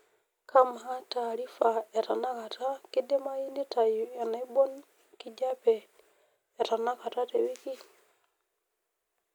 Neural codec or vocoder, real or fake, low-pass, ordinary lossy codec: none; real; none; none